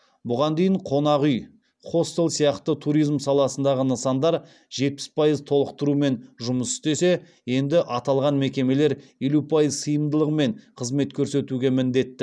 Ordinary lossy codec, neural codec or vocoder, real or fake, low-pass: none; none; real; none